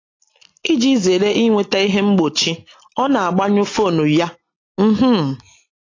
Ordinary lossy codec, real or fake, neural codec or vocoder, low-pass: AAC, 32 kbps; real; none; 7.2 kHz